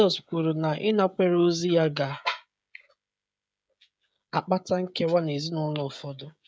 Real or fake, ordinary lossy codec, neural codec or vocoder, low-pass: fake; none; codec, 16 kHz, 16 kbps, FreqCodec, smaller model; none